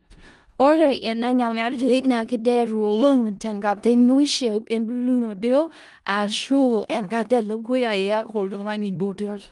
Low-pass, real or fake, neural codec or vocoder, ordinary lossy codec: 10.8 kHz; fake; codec, 16 kHz in and 24 kHz out, 0.4 kbps, LongCat-Audio-Codec, four codebook decoder; Opus, 32 kbps